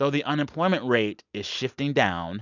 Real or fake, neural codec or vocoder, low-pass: real; none; 7.2 kHz